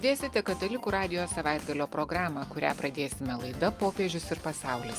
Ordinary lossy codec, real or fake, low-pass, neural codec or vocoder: Opus, 32 kbps; real; 14.4 kHz; none